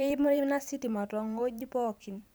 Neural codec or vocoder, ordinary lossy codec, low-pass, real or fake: vocoder, 44.1 kHz, 128 mel bands every 512 samples, BigVGAN v2; none; none; fake